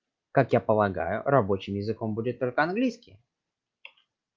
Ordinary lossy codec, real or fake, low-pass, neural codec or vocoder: Opus, 32 kbps; real; 7.2 kHz; none